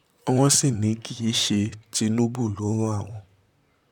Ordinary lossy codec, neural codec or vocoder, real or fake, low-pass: none; vocoder, 44.1 kHz, 128 mel bands, Pupu-Vocoder; fake; 19.8 kHz